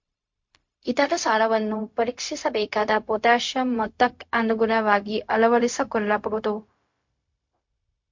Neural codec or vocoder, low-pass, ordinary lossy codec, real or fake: codec, 16 kHz, 0.4 kbps, LongCat-Audio-Codec; 7.2 kHz; MP3, 48 kbps; fake